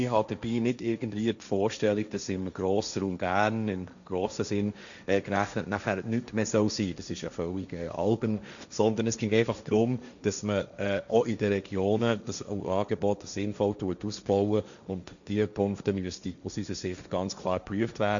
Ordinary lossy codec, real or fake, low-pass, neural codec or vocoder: none; fake; 7.2 kHz; codec, 16 kHz, 1.1 kbps, Voila-Tokenizer